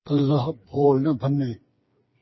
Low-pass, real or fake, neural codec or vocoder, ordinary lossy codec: 7.2 kHz; fake; codec, 16 kHz, 4 kbps, FreqCodec, smaller model; MP3, 24 kbps